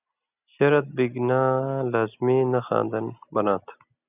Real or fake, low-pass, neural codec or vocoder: real; 3.6 kHz; none